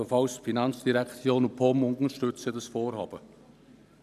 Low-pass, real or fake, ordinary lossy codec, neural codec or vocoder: 14.4 kHz; fake; none; vocoder, 44.1 kHz, 128 mel bands every 512 samples, BigVGAN v2